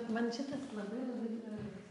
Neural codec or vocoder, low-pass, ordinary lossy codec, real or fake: none; 10.8 kHz; MP3, 64 kbps; real